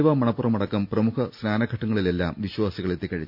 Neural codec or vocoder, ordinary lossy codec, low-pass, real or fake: none; none; 5.4 kHz; real